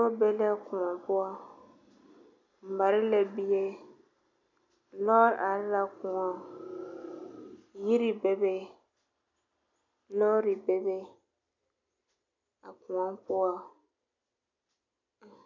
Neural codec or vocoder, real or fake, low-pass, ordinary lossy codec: none; real; 7.2 kHz; MP3, 48 kbps